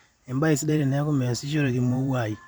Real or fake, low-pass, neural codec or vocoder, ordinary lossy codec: fake; none; vocoder, 44.1 kHz, 128 mel bands every 512 samples, BigVGAN v2; none